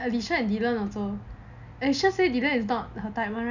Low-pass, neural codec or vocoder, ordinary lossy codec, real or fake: 7.2 kHz; none; none; real